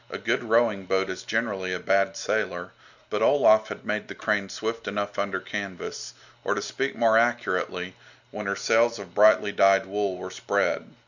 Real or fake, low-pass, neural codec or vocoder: real; 7.2 kHz; none